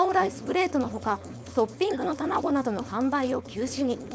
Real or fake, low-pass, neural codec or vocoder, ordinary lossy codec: fake; none; codec, 16 kHz, 4.8 kbps, FACodec; none